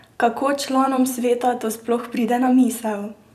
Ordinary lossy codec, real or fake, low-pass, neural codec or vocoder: none; fake; 14.4 kHz; vocoder, 44.1 kHz, 128 mel bands every 256 samples, BigVGAN v2